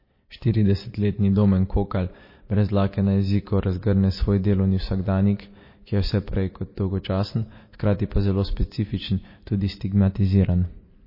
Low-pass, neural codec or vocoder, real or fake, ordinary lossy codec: 5.4 kHz; none; real; MP3, 24 kbps